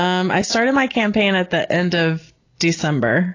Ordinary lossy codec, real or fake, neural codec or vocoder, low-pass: AAC, 32 kbps; real; none; 7.2 kHz